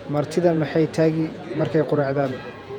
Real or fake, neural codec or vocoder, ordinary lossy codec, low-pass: fake; vocoder, 48 kHz, 128 mel bands, Vocos; none; 19.8 kHz